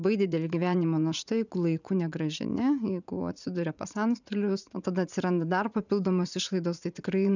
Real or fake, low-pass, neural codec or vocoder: fake; 7.2 kHz; vocoder, 44.1 kHz, 80 mel bands, Vocos